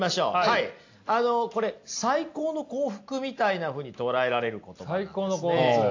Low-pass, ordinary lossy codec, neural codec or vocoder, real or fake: 7.2 kHz; AAC, 48 kbps; none; real